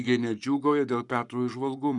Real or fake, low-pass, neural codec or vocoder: fake; 10.8 kHz; codec, 44.1 kHz, 7.8 kbps, Pupu-Codec